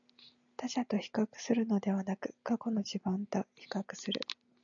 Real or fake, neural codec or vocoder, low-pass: real; none; 7.2 kHz